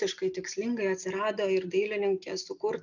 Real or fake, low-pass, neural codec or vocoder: real; 7.2 kHz; none